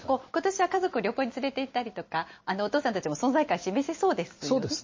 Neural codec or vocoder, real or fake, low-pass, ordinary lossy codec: none; real; 7.2 kHz; MP3, 32 kbps